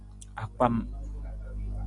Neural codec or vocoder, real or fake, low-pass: none; real; 10.8 kHz